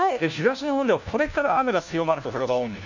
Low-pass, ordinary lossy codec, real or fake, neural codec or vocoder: 7.2 kHz; none; fake; codec, 16 kHz, 1 kbps, FunCodec, trained on LibriTTS, 50 frames a second